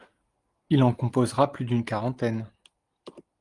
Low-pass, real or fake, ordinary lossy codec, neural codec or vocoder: 10.8 kHz; real; Opus, 24 kbps; none